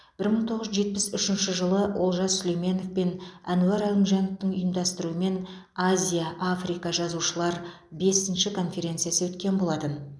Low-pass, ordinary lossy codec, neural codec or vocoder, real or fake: none; none; none; real